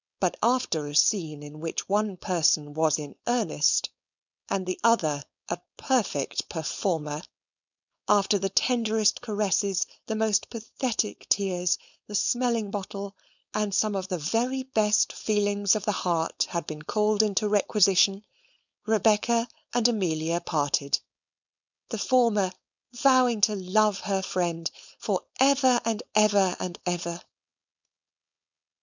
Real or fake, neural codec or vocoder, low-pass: fake; codec, 16 kHz, 4.8 kbps, FACodec; 7.2 kHz